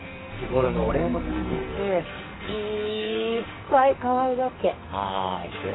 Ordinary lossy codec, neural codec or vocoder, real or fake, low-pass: AAC, 16 kbps; codec, 44.1 kHz, 2.6 kbps, SNAC; fake; 7.2 kHz